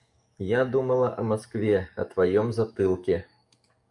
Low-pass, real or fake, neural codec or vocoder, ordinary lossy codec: 10.8 kHz; fake; codec, 44.1 kHz, 7.8 kbps, DAC; MP3, 96 kbps